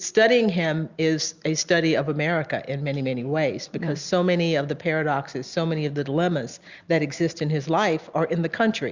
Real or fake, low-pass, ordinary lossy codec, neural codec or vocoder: real; 7.2 kHz; Opus, 64 kbps; none